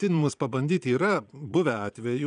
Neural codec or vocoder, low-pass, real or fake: vocoder, 22.05 kHz, 80 mel bands, Vocos; 9.9 kHz; fake